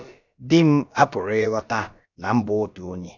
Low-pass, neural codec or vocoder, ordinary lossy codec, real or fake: 7.2 kHz; codec, 16 kHz, about 1 kbps, DyCAST, with the encoder's durations; none; fake